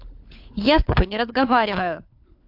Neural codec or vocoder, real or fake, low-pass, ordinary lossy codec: codec, 16 kHz, 4 kbps, FunCodec, trained on LibriTTS, 50 frames a second; fake; 5.4 kHz; MP3, 48 kbps